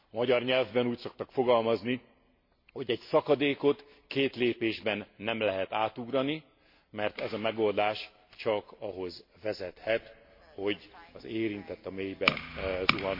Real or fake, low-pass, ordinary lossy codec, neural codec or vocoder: real; 5.4 kHz; MP3, 32 kbps; none